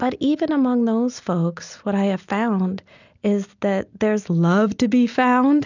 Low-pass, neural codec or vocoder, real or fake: 7.2 kHz; none; real